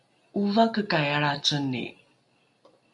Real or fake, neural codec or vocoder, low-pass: real; none; 10.8 kHz